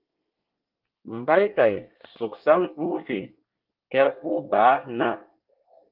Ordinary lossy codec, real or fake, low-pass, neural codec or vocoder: Opus, 32 kbps; fake; 5.4 kHz; codec, 24 kHz, 1 kbps, SNAC